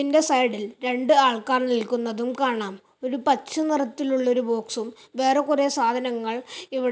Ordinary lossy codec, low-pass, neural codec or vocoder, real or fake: none; none; none; real